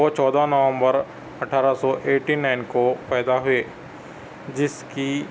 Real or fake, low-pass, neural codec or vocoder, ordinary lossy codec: real; none; none; none